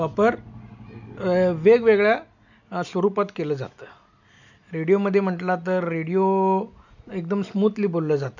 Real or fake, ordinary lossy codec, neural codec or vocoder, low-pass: real; none; none; 7.2 kHz